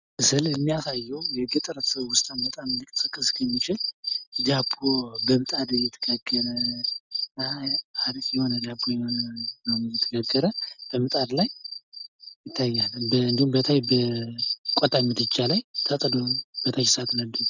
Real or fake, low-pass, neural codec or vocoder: real; 7.2 kHz; none